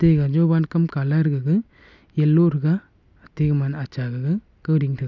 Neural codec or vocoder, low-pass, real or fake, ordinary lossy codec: none; 7.2 kHz; real; none